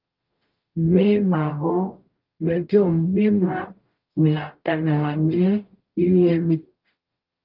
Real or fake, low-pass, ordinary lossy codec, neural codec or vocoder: fake; 5.4 kHz; Opus, 32 kbps; codec, 44.1 kHz, 0.9 kbps, DAC